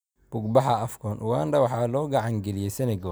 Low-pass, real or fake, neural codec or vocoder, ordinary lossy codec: none; real; none; none